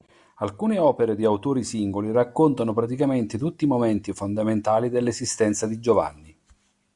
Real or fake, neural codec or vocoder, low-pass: real; none; 10.8 kHz